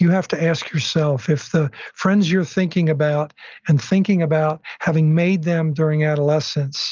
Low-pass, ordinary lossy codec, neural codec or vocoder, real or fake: 7.2 kHz; Opus, 24 kbps; none; real